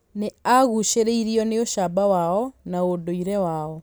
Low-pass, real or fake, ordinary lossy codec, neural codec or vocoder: none; real; none; none